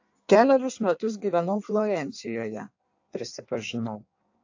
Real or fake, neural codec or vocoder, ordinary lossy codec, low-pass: fake; codec, 16 kHz in and 24 kHz out, 1.1 kbps, FireRedTTS-2 codec; AAC, 48 kbps; 7.2 kHz